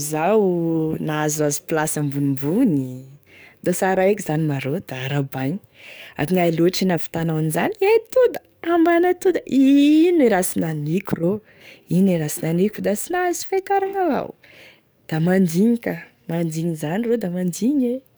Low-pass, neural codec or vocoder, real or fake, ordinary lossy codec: none; codec, 44.1 kHz, 7.8 kbps, DAC; fake; none